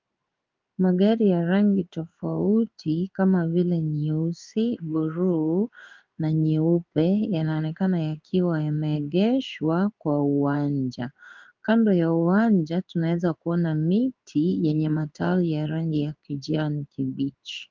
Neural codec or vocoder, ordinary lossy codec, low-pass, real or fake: codec, 16 kHz in and 24 kHz out, 1 kbps, XY-Tokenizer; Opus, 24 kbps; 7.2 kHz; fake